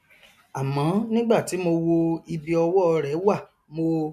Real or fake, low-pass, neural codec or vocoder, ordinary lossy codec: real; 14.4 kHz; none; none